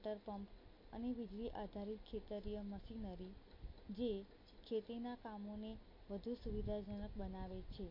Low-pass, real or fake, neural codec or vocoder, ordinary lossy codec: 5.4 kHz; real; none; MP3, 32 kbps